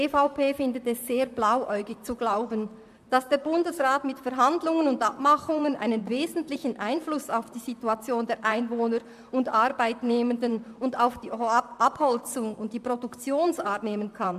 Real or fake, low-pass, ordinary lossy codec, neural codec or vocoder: fake; 14.4 kHz; MP3, 96 kbps; vocoder, 44.1 kHz, 128 mel bands, Pupu-Vocoder